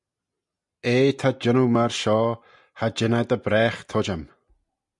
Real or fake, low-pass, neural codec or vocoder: real; 10.8 kHz; none